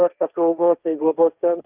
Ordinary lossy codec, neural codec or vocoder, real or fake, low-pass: Opus, 24 kbps; codec, 16 kHz, 1.1 kbps, Voila-Tokenizer; fake; 3.6 kHz